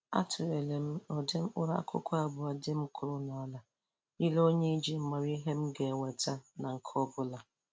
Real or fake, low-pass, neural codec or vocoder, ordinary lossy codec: real; none; none; none